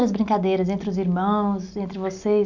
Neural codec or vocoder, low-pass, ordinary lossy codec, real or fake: none; 7.2 kHz; none; real